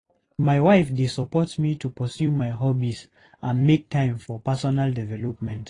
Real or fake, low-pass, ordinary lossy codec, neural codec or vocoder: fake; 10.8 kHz; AAC, 32 kbps; vocoder, 44.1 kHz, 128 mel bands every 256 samples, BigVGAN v2